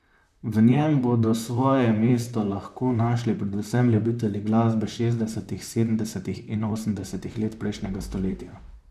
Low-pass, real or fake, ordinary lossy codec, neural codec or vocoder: 14.4 kHz; fake; none; vocoder, 44.1 kHz, 128 mel bands, Pupu-Vocoder